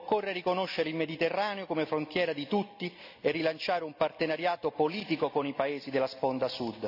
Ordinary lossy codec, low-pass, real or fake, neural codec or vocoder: none; 5.4 kHz; real; none